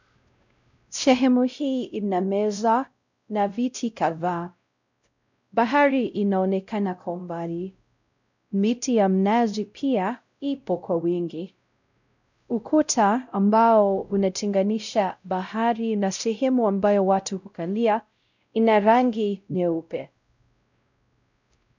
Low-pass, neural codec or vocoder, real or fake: 7.2 kHz; codec, 16 kHz, 0.5 kbps, X-Codec, WavLM features, trained on Multilingual LibriSpeech; fake